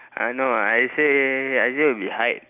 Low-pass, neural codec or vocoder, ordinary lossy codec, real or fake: 3.6 kHz; none; none; real